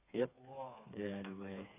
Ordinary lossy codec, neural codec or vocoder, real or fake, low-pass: AAC, 32 kbps; codec, 16 kHz, 4 kbps, FreqCodec, smaller model; fake; 3.6 kHz